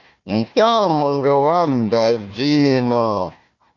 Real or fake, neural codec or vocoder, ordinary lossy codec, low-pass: fake; codec, 16 kHz, 1 kbps, FunCodec, trained on Chinese and English, 50 frames a second; Opus, 64 kbps; 7.2 kHz